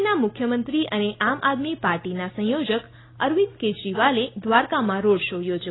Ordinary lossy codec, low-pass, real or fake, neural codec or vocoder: AAC, 16 kbps; 7.2 kHz; real; none